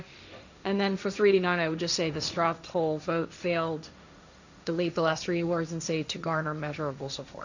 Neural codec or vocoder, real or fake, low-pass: codec, 16 kHz, 1.1 kbps, Voila-Tokenizer; fake; 7.2 kHz